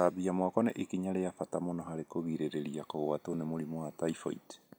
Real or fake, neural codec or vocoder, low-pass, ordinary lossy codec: real; none; none; none